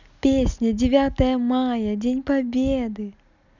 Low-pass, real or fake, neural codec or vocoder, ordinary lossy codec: 7.2 kHz; real; none; none